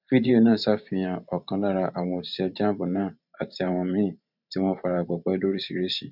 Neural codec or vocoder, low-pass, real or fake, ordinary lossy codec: vocoder, 44.1 kHz, 128 mel bands every 512 samples, BigVGAN v2; 5.4 kHz; fake; none